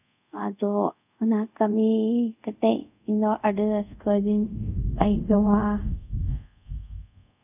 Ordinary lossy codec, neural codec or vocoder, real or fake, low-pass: none; codec, 24 kHz, 0.5 kbps, DualCodec; fake; 3.6 kHz